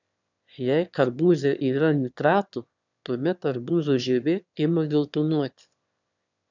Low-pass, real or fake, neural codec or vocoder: 7.2 kHz; fake; autoencoder, 22.05 kHz, a latent of 192 numbers a frame, VITS, trained on one speaker